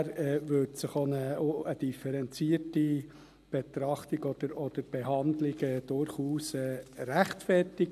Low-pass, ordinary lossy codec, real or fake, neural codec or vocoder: 14.4 kHz; MP3, 96 kbps; real; none